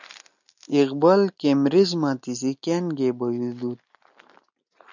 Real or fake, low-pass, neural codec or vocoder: real; 7.2 kHz; none